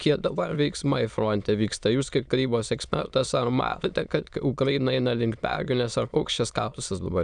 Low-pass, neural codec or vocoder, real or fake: 9.9 kHz; autoencoder, 22.05 kHz, a latent of 192 numbers a frame, VITS, trained on many speakers; fake